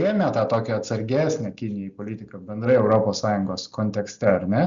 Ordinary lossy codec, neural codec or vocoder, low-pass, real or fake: Opus, 64 kbps; none; 7.2 kHz; real